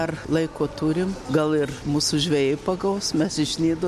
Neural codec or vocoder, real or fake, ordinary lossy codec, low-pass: none; real; MP3, 64 kbps; 14.4 kHz